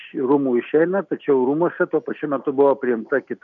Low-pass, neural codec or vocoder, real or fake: 7.2 kHz; none; real